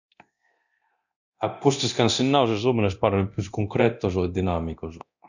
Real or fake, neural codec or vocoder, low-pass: fake; codec, 24 kHz, 0.9 kbps, DualCodec; 7.2 kHz